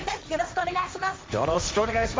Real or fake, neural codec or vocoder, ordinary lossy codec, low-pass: fake; codec, 16 kHz, 1.1 kbps, Voila-Tokenizer; none; none